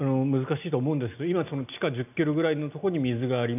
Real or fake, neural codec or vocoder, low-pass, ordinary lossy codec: real; none; 3.6 kHz; none